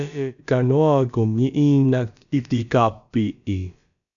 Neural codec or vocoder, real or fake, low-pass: codec, 16 kHz, about 1 kbps, DyCAST, with the encoder's durations; fake; 7.2 kHz